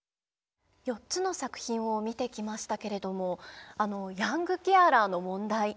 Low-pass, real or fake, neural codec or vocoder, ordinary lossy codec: none; real; none; none